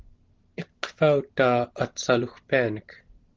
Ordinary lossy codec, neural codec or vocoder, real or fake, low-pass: Opus, 16 kbps; none; real; 7.2 kHz